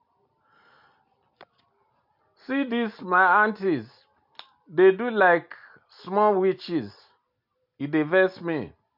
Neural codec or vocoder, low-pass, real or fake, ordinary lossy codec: none; 5.4 kHz; real; MP3, 48 kbps